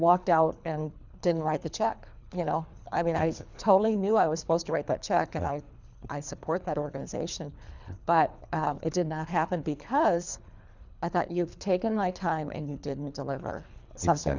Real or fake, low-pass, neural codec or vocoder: fake; 7.2 kHz; codec, 24 kHz, 3 kbps, HILCodec